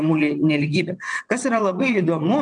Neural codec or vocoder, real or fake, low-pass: vocoder, 22.05 kHz, 80 mel bands, WaveNeXt; fake; 9.9 kHz